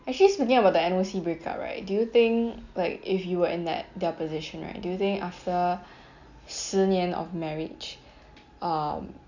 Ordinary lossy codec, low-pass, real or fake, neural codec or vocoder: none; 7.2 kHz; real; none